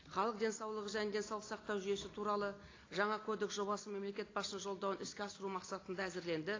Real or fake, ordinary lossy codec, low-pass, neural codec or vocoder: real; AAC, 32 kbps; 7.2 kHz; none